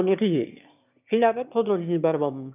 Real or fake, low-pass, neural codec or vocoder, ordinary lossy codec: fake; 3.6 kHz; autoencoder, 22.05 kHz, a latent of 192 numbers a frame, VITS, trained on one speaker; none